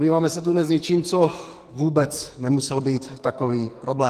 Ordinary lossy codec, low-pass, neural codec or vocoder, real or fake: Opus, 24 kbps; 14.4 kHz; codec, 44.1 kHz, 2.6 kbps, SNAC; fake